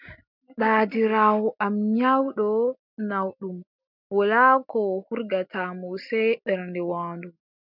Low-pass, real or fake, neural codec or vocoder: 5.4 kHz; real; none